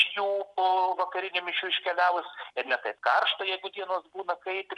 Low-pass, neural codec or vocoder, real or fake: 10.8 kHz; none; real